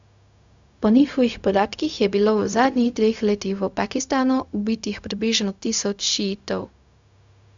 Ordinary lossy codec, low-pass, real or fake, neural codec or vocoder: Opus, 64 kbps; 7.2 kHz; fake; codec, 16 kHz, 0.4 kbps, LongCat-Audio-Codec